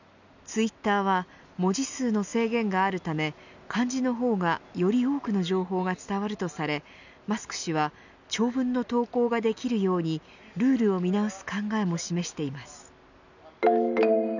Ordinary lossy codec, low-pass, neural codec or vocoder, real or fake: none; 7.2 kHz; none; real